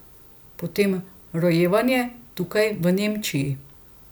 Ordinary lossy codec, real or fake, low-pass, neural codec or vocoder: none; real; none; none